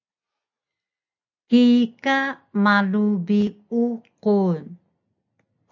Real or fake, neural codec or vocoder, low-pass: real; none; 7.2 kHz